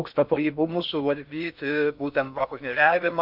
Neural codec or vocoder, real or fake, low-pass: codec, 16 kHz in and 24 kHz out, 0.6 kbps, FocalCodec, streaming, 2048 codes; fake; 5.4 kHz